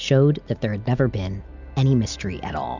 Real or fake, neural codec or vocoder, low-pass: real; none; 7.2 kHz